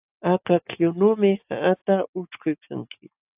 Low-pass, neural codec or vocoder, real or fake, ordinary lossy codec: 3.6 kHz; none; real; AAC, 32 kbps